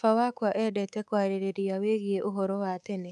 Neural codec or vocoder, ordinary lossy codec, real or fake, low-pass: codec, 24 kHz, 3.1 kbps, DualCodec; none; fake; none